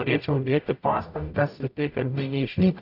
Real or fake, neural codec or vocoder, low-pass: fake; codec, 44.1 kHz, 0.9 kbps, DAC; 5.4 kHz